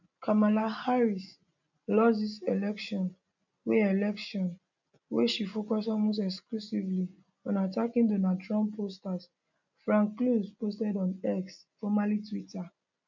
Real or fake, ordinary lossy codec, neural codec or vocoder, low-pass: real; none; none; 7.2 kHz